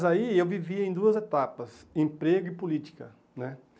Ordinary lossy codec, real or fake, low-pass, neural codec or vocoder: none; real; none; none